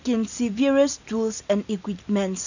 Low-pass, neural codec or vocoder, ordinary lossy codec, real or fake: 7.2 kHz; none; none; real